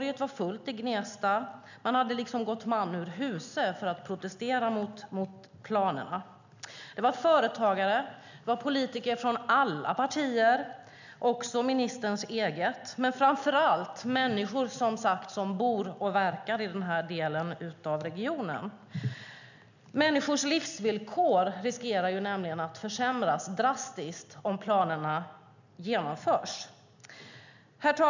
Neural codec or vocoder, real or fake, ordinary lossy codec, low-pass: none; real; none; 7.2 kHz